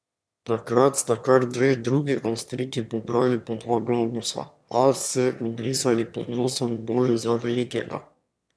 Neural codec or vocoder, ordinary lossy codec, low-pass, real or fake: autoencoder, 22.05 kHz, a latent of 192 numbers a frame, VITS, trained on one speaker; none; none; fake